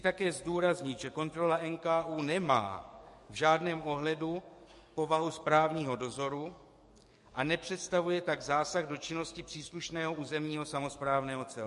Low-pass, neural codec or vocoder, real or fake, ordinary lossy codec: 14.4 kHz; codec, 44.1 kHz, 7.8 kbps, DAC; fake; MP3, 48 kbps